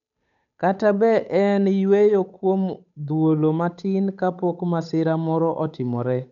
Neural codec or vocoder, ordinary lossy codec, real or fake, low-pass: codec, 16 kHz, 8 kbps, FunCodec, trained on Chinese and English, 25 frames a second; none; fake; 7.2 kHz